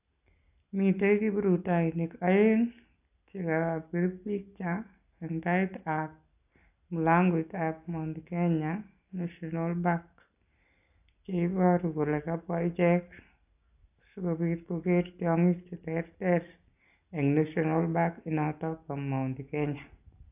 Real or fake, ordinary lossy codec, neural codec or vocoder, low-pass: real; AAC, 32 kbps; none; 3.6 kHz